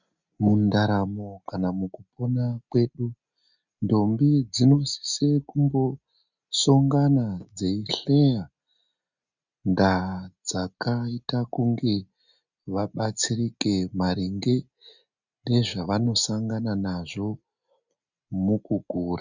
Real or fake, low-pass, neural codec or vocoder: real; 7.2 kHz; none